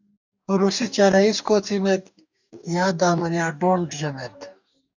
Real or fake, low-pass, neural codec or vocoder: fake; 7.2 kHz; codec, 44.1 kHz, 2.6 kbps, DAC